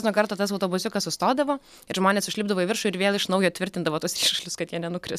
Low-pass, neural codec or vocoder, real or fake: 14.4 kHz; none; real